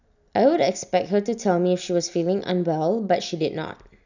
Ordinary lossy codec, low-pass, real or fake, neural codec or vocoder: none; 7.2 kHz; real; none